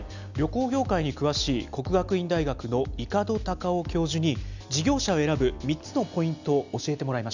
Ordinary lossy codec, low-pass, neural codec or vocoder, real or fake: none; 7.2 kHz; none; real